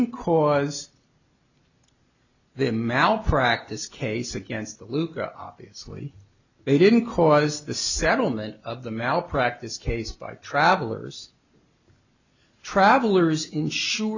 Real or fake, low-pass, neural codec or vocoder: real; 7.2 kHz; none